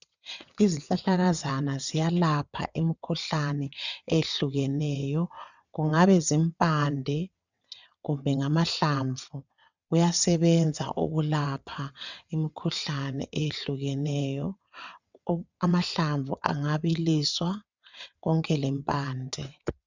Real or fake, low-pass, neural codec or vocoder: fake; 7.2 kHz; vocoder, 22.05 kHz, 80 mel bands, WaveNeXt